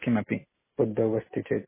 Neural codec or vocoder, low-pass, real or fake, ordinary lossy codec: none; 3.6 kHz; real; MP3, 16 kbps